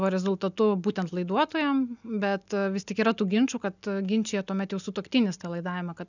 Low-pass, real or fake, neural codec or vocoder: 7.2 kHz; real; none